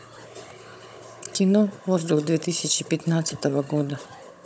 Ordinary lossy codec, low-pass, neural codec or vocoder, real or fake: none; none; codec, 16 kHz, 16 kbps, FunCodec, trained on Chinese and English, 50 frames a second; fake